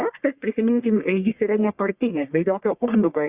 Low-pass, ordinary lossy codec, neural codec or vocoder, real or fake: 3.6 kHz; Opus, 64 kbps; codec, 44.1 kHz, 1.7 kbps, Pupu-Codec; fake